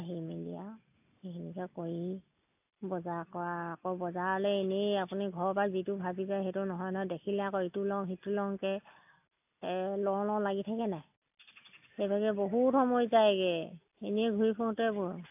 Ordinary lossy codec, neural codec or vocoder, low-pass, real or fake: AAC, 32 kbps; none; 3.6 kHz; real